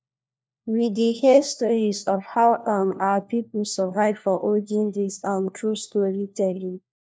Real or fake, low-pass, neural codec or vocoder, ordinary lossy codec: fake; none; codec, 16 kHz, 1 kbps, FunCodec, trained on LibriTTS, 50 frames a second; none